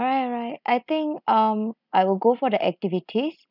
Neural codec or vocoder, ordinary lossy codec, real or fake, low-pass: none; none; real; 5.4 kHz